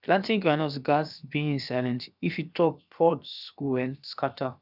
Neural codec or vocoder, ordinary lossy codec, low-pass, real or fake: codec, 16 kHz, about 1 kbps, DyCAST, with the encoder's durations; none; 5.4 kHz; fake